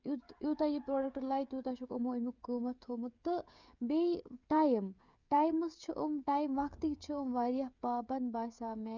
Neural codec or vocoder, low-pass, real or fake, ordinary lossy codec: codec, 16 kHz, 16 kbps, FreqCodec, smaller model; 7.2 kHz; fake; Opus, 64 kbps